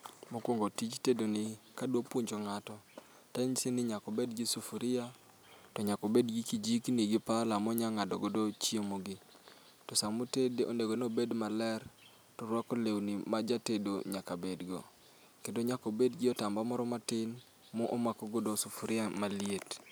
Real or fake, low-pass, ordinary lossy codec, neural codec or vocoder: real; none; none; none